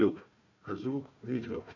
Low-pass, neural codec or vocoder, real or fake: 7.2 kHz; codec, 16 kHz, 1 kbps, FunCodec, trained on Chinese and English, 50 frames a second; fake